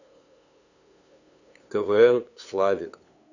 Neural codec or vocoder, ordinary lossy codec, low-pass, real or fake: codec, 16 kHz, 2 kbps, FunCodec, trained on LibriTTS, 25 frames a second; none; 7.2 kHz; fake